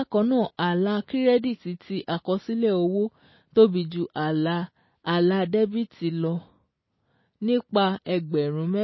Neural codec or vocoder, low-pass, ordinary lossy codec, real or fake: none; 7.2 kHz; MP3, 24 kbps; real